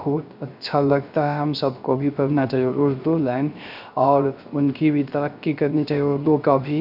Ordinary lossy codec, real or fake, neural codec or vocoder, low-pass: none; fake; codec, 16 kHz, 0.3 kbps, FocalCodec; 5.4 kHz